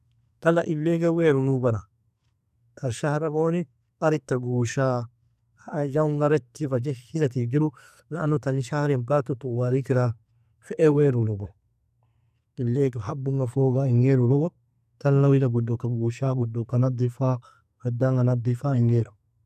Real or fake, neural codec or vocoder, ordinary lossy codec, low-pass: fake; codec, 44.1 kHz, 2.6 kbps, SNAC; none; 14.4 kHz